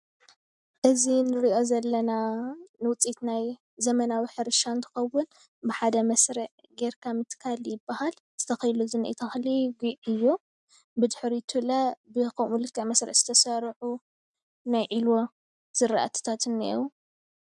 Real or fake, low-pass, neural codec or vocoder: real; 10.8 kHz; none